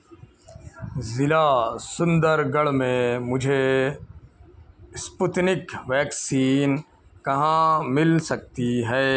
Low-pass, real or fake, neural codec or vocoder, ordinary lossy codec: none; real; none; none